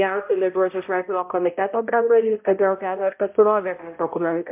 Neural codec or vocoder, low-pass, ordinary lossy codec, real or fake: codec, 16 kHz, 0.5 kbps, X-Codec, HuBERT features, trained on balanced general audio; 3.6 kHz; MP3, 32 kbps; fake